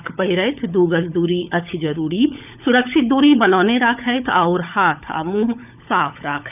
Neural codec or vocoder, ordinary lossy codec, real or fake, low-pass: codec, 16 kHz, 16 kbps, FunCodec, trained on LibriTTS, 50 frames a second; none; fake; 3.6 kHz